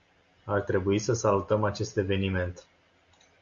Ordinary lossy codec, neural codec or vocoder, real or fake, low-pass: Opus, 64 kbps; none; real; 7.2 kHz